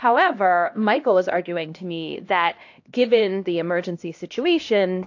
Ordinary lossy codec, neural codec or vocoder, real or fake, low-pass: AAC, 48 kbps; codec, 16 kHz, 1 kbps, X-Codec, HuBERT features, trained on LibriSpeech; fake; 7.2 kHz